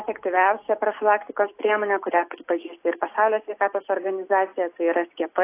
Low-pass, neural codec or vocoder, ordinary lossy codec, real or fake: 3.6 kHz; none; AAC, 24 kbps; real